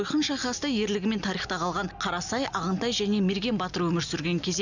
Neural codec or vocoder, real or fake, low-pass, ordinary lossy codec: none; real; 7.2 kHz; none